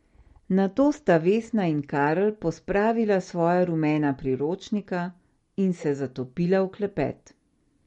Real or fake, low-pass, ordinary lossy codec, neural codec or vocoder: real; 19.8 kHz; MP3, 48 kbps; none